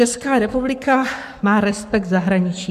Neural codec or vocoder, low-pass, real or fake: codec, 44.1 kHz, 7.8 kbps, Pupu-Codec; 14.4 kHz; fake